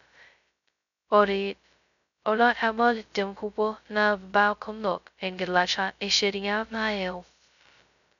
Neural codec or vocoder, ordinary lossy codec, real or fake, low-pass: codec, 16 kHz, 0.2 kbps, FocalCodec; none; fake; 7.2 kHz